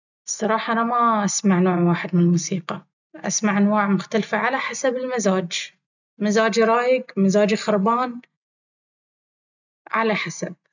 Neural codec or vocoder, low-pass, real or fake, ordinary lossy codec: none; 7.2 kHz; real; none